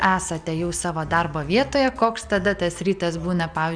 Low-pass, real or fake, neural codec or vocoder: 9.9 kHz; real; none